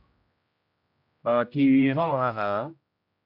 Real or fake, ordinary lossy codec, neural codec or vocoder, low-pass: fake; none; codec, 16 kHz, 0.5 kbps, X-Codec, HuBERT features, trained on general audio; 5.4 kHz